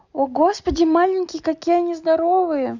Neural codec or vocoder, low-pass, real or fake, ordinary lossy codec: none; 7.2 kHz; real; none